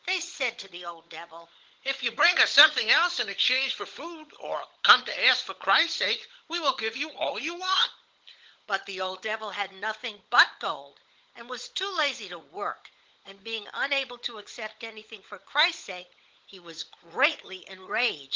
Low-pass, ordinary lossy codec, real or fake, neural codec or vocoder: 7.2 kHz; Opus, 16 kbps; fake; codec, 16 kHz, 16 kbps, FunCodec, trained on LibriTTS, 50 frames a second